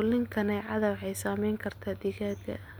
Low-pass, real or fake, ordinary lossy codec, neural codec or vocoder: none; real; none; none